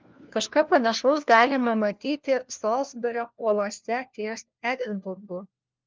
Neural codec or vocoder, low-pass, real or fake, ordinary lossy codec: codec, 16 kHz, 1 kbps, FunCodec, trained on LibriTTS, 50 frames a second; 7.2 kHz; fake; Opus, 32 kbps